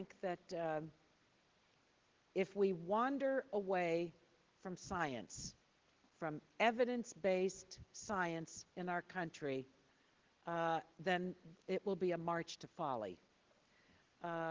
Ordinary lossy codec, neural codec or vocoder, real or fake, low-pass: Opus, 16 kbps; none; real; 7.2 kHz